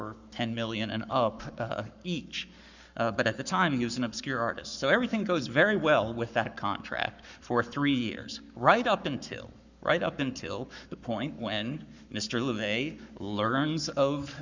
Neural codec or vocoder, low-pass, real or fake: codec, 44.1 kHz, 7.8 kbps, Pupu-Codec; 7.2 kHz; fake